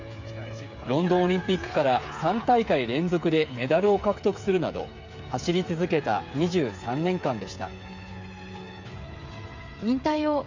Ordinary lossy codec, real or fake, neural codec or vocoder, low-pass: MP3, 64 kbps; fake; codec, 16 kHz, 8 kbps, FreqCodec, smaller model; 7.2 kHz